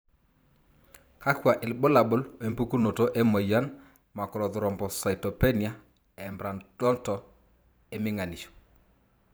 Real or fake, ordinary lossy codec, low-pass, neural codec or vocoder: fake; none; none; vocoder, 44.1 kHz, 128 mel bands every 512 samples, BigVGAN v2